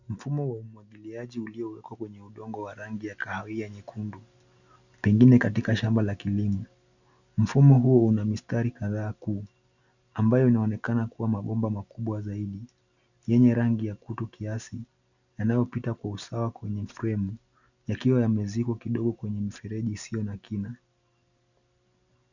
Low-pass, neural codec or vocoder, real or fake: 7.2 kHz; none; real